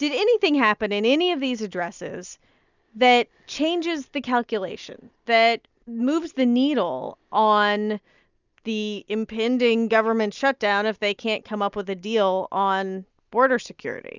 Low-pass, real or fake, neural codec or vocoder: 7.2 kHz; real; none